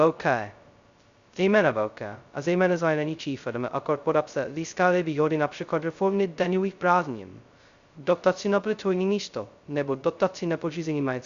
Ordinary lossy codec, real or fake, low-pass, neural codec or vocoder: Opus, 64 kbps; fake; 7.2 kHz; codec, 16 kHz, 0.2 kbps, FocalCodec